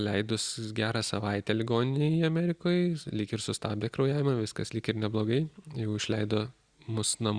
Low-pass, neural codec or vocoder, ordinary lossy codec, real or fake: 9.9 kHz; none; Opus, 64 kbps; real